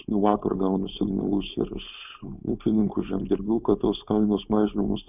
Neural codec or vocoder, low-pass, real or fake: codec, 16 kHz, 4.8 kbps, FACodec; 3.6 kHz; fake